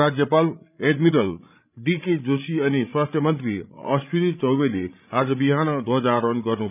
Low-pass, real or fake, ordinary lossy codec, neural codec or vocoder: 3.6 kHz; fake; none; codec, 16 kHz, 16 kbps, FreqCodec, larger model